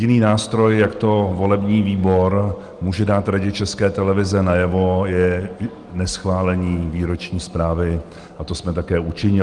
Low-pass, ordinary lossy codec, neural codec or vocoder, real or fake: 10.8 kHz; Opus, 24 kbps; none; real